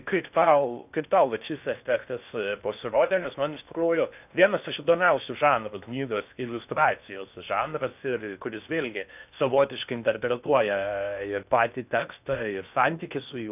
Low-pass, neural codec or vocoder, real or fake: 3.6 kHz; codec, 16 kHz in and 24 kHz out, 0.6 kbps, FocalCodec, streaming, 4096 codes; fake